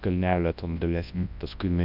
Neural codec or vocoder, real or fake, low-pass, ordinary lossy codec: codec, 24 kHz, 0.9 kbps, WavTokenizer, large speech release; fake; 5.4 kHz; AAC, 48 kbps